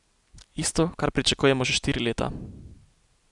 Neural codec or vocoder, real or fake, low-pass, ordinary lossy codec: none; real; 10.8 kHz; none